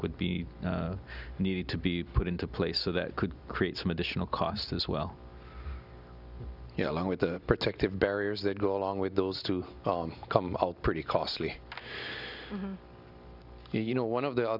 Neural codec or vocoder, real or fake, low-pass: none; real; 5.4 kHz